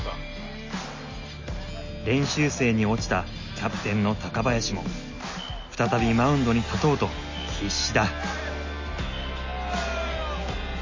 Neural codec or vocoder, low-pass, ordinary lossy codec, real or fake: none; 7.2 kHz; MP3, 32 kbps; real